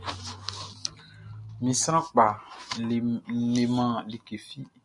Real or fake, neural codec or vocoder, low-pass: real; none; 10.8 kHz